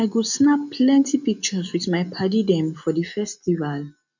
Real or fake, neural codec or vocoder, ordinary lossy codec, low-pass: real; none; none; 7.2 kHz